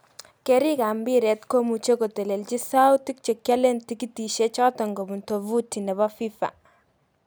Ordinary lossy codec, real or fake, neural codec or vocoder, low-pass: none; real; none; none